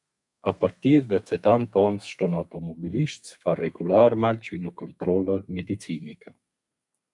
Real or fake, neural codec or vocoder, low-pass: fake; codec, 32 kHz, 1.9 kbps, SNAC; 10.8 kHz